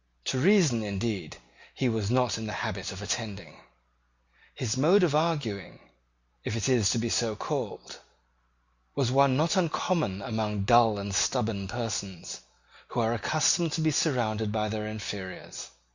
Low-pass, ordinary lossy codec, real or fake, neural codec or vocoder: 7.2 kHz; Opus, 64 kbps; real; none